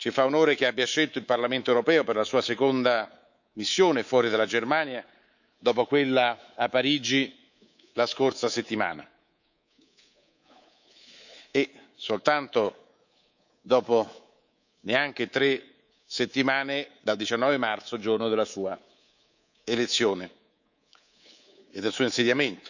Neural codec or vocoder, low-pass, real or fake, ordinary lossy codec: codec, 24 kHz, 3.1 kbps, DualCodec; 7.2 kHz; fake; none